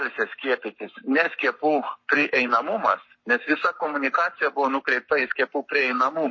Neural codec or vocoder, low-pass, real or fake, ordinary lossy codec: codec, 44.1 kHz, 7.8 kbps, Pupu-Codec; 7.2 kHz; fake; MP3, 32 kbps